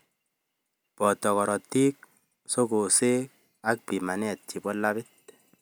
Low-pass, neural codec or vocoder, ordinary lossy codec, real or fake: none; none; none; real